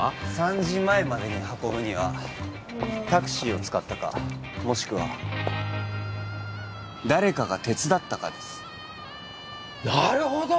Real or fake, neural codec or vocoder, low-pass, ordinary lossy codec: real; none; none; none